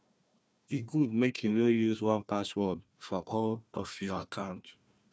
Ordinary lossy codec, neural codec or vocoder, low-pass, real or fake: none; codec, 16 kHz, 1 kbps, FunCodec, trained on Chinese and English, 50 frames a second; none; fake